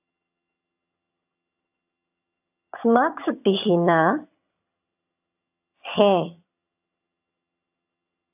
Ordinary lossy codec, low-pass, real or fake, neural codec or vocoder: AAC, 32 kbps; 3.6 kHz; fake; vocoder, 22.05 kHz, 80 mel bands, HiFi-GAN